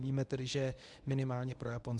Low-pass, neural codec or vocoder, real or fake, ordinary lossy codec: 10.8 kHz; codec, 24 kHz, 0.9 kbps, WavTokenizer, medium speech release version 1; fake; MP3, 96 kbps